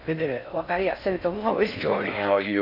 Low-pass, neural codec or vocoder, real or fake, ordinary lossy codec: 5.4 kHz; codec, 16 kHz in and 24 kHz out, 0.6 kbps, FocalCodec, streaming, 4096 codes; fake; AAC, 48 kbps